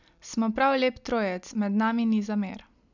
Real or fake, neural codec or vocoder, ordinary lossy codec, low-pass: real; none; none; 7.2 kHz